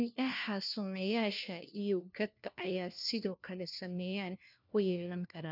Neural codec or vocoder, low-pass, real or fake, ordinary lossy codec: codec, 16 kHz, 1 kbps, FunCodec, trained on LibriTTS, 50 frames a second; 5.4 kHz; fake; none